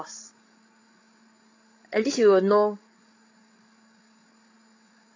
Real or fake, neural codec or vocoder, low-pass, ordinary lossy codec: fake; codec, 16 kHz, 16 kbps, FreqCodec, larger model; 7.2 kHz; AAC, 32 kbps